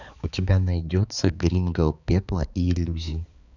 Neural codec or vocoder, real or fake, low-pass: codec, 16 kHz, 4 kbps, X-Codec, HuBERT features, trained on balanced general audio; fake; 7.2 kHz